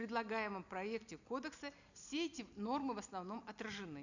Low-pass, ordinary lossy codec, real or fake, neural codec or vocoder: 7.2 kHz; none; real; none